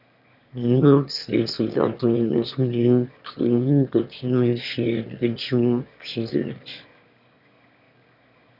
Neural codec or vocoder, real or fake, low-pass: autoencoder, 22.05 kHz, a latent of 192 numbers a frame, VITS, trained on one speaker; fake; 5.4 kHz